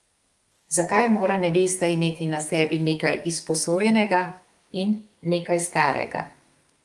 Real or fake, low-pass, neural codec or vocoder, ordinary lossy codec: fake; 10.8 kHz; codec, 32 kHz, 1.9 kbps, SNAC; Opus, 32 kbps